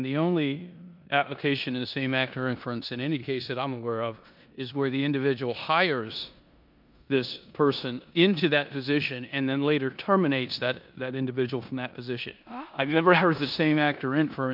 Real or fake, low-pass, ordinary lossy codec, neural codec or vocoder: fake; 5.4 kHz; MP3, 48 kbps; codec, 16 kHz in and 24 kHz out, 0.9 kbps, LongCat-Audio-Codec, four codebook decoder